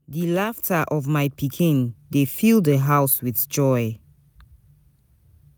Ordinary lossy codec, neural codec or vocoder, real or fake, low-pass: none; none; real; none